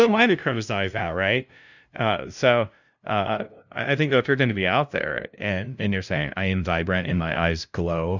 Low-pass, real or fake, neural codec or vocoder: 7.2 kHz; fake; codec, 16 kHz, 0.5 kbps, FunCodec, trained on LibriTTS, 25 frames a second